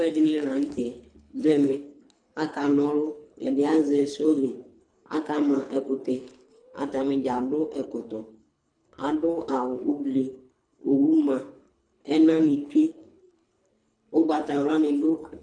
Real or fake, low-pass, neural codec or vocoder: fake; 9.9 kHz; codec, 24 kHz, 3 kbps, HILCodec